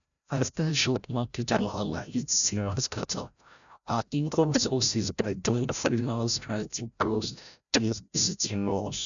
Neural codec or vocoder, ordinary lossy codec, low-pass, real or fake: codec, 16 kHz, 0.5 kbps, FreqCodec, larger model; none; 7.2 kHz; fake